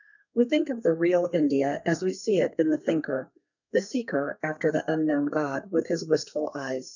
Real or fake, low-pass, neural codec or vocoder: fake; 7.2 kHz; codec, 32 kHz, 1.9 kbps, SNAC